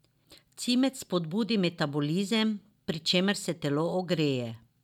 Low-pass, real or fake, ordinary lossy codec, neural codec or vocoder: 19.8 kHz; real; none; none